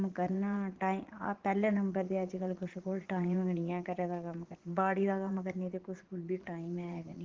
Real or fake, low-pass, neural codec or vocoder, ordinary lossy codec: fake; 7.2 kHz; vocoder, 44.1 kHz, 80 mel bands, Vocos; Opus, 16 kbps